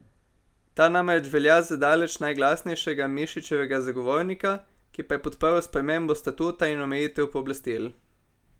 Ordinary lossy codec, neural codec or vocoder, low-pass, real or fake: Opus, 32 kbps; none; 19.8 kHz; real